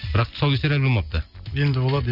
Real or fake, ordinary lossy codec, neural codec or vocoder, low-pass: real; none; none; 5.4 kHz